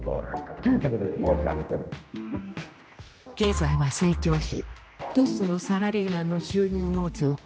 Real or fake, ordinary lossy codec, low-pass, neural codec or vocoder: fake; none; none; codec, 16 kHz, 1 kbps, X-Codec, HuBERT features, trained on general audio